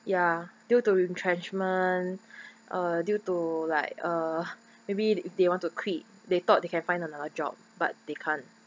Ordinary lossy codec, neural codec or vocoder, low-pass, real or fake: none; none; none; real